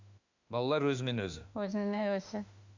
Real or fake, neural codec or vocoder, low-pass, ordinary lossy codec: fake; autoencoder, 48 kHz, 32 numbers a frame, DAC-VAE, trained on Japanese speech; 7.2 kHz; none